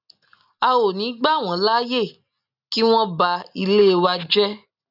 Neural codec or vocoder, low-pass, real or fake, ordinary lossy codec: none; 5.4 kHz; real; none